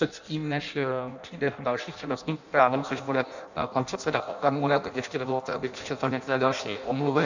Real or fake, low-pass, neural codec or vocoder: fake; 7.2 kHz; codec, 16 kHz in and 24 kHz out, 0.6 kbps, FireRedTTS-2 codec